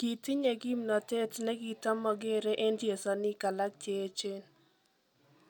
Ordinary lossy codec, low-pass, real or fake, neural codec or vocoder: none; 19.8 kHz; real; none